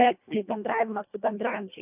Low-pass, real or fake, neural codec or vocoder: 3.6 kHz; fake; codec, 24 kHz, 1.5 kbps, HILCodec